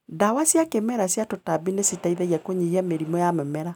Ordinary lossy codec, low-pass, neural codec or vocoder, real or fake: none; 19.8 kHz; none; real